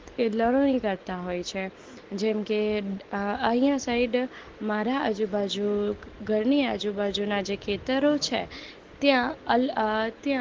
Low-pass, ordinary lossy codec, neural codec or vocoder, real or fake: 7.2 kHz; Opus, 16 kbps; none; real